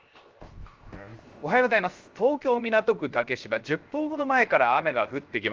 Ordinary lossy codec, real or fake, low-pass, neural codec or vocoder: Opus, 32 kbps; fake; 7.2 kHz; codec, 16 kHz, 0.7 kbps, FocalCodec